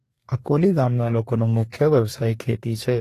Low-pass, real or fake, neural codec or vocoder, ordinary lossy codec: 14.4 kHz; fake; codec, 44.1 kHz, 2.6 kbps, DAC; AAC, 48 kbps